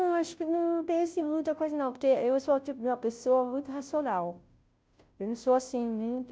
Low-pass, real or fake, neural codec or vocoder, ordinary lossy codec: none; fake; codec, 16 kHz, 0.5 kbps, FunCodec, trained on Chinese and English, 25 frames a second; none